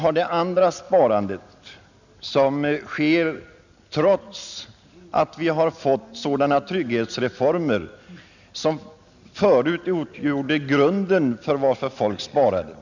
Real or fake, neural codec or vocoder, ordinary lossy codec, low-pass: real; none; none; 7.2 kHz